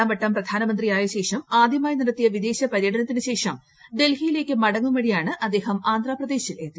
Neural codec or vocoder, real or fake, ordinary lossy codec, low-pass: none; real; none; none